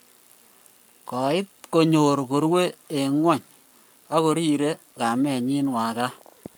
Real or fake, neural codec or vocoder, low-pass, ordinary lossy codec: fake; codec, 44.1 kHz, 7.8 kbps, Pupu-Codec; none; none